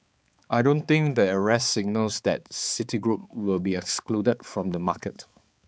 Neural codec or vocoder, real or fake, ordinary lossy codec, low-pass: codec, 16 kHz, 4 kbps, X-Codec, HuBERT features, trained on balanced general audio; fake; none; none